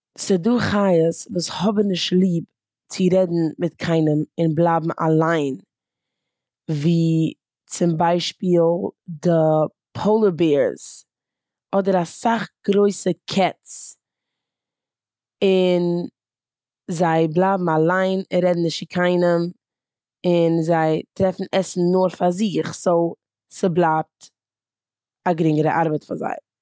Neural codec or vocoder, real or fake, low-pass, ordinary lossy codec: none; real; none; none